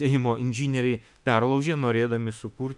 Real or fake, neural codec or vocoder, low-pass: fake; autoencoder, 48 kHz, 32 numbers a frame, DAC-VAE, trained on Japanese speech; 10.8 kHz